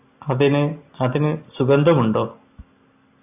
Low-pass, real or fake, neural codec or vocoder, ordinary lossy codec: 3.6 kHz; real; none; AAC, 32 kbps